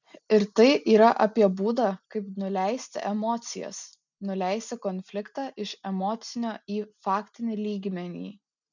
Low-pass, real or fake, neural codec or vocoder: 7.2 kHz; real; none